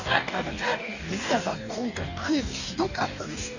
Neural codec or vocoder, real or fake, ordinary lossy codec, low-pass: codec, 44.1 kHz, 2.6 kbps, DAC; fake; none; 7.2 kHz